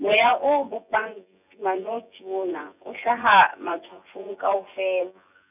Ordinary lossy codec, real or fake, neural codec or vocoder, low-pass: none; fake; vocoder, 24 kHz, 100 mel bands, Vocos; 3.6 kHz